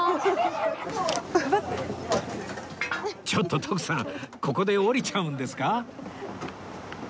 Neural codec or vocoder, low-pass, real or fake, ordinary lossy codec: none; none; real; none